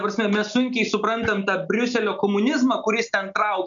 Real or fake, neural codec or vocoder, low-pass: real; none; 7.2 kHz